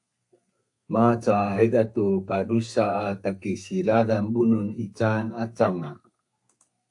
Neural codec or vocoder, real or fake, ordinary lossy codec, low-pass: codec, 32 kHz, 1.9 kbps, SNAC; fake; AAC, 64 kbps; 10.8 kHz